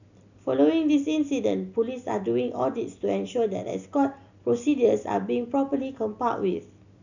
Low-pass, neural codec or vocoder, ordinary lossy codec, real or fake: 7.2 kHz; none; none; real